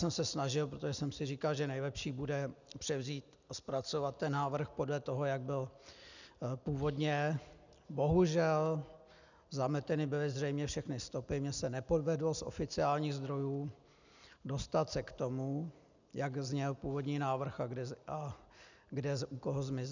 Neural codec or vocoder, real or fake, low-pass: none; real; 7.2 kHz